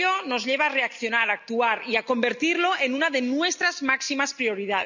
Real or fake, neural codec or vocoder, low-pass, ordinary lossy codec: real; none; 7.2 kHz; none